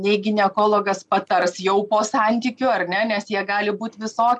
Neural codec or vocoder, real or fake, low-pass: none; real; 10.8 kHz